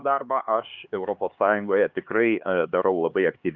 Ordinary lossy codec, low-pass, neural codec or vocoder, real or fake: Opus, 32 kbps; 7.2 kHz; codec, 16 kHz, 4 kbps, X-Codec, HuBERT features, trained on LibriSpeech; fake